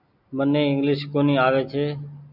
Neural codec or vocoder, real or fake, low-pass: none; real; 5.4 kHz